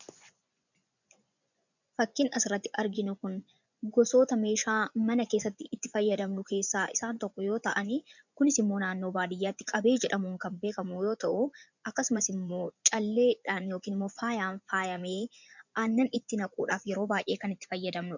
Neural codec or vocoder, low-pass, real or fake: vocoder, 24 kHz, 100 mel bands, Vocos; 7.2 kHz; fake